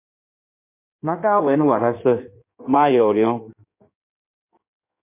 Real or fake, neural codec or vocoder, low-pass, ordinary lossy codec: fake; codec, 16 kHz in and 24 kHz out, 1.1 kbps, FireRedTTS-2 codec; 3.6 kHz; MP3, 24 kbps